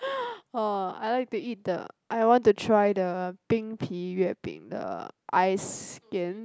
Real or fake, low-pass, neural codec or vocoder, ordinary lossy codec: real; none; none; none